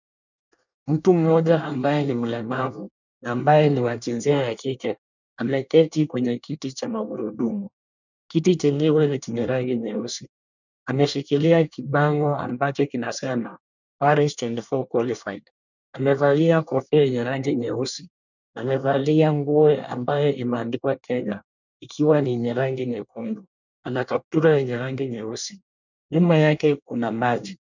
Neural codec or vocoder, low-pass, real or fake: codec, 24 kHz, 1 kbps, SNAC; 7.2 kHz; fake